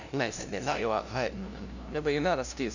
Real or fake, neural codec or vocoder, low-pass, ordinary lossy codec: fake; codec, 16 kHz, 0.5 kbps, FunCodec, trained on LibriTTS, 25 frames a second; 7.2 kHz; none